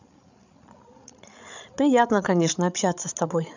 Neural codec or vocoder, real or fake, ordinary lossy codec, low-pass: codec, 16 kHz, 8 kbps, FreqCodec, larger model; fake; none; 7.2 kHz